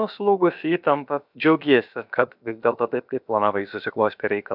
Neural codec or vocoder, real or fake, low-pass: codec, 16 kHz, about 1 kbps, DyCAST, with the encoder's durations; fake; 5.4 kHz